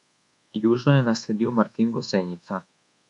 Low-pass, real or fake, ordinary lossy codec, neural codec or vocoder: 10.8 kHz; fake; none; codec, 24 kHz, 1.2 kbps, DualCodec